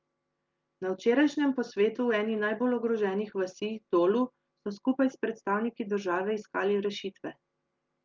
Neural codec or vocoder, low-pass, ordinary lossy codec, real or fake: none; 7.2 kHz; Opus, 24 kbps; real